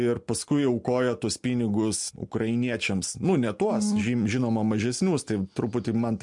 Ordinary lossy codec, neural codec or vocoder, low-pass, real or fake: MP3, 64 kbps; none; 10.8 kHz; real